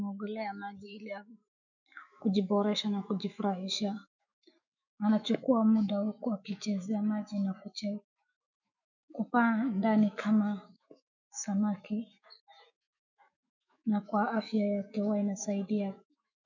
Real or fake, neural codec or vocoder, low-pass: fake; autoencoder, 48 kHz, 128 numbers a frame, DAC-VAE, trained on Japanese speech; 7.2 kHz